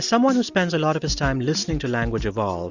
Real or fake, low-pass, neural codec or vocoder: real; 7.2 kHz; none